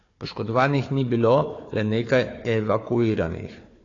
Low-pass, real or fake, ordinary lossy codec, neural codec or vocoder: 7.2 kHz; fake; AAC, 32 kbps; codec, 16 kHz, 4 kbps, FunCodec, trained on Chinese and English, 50 frames a second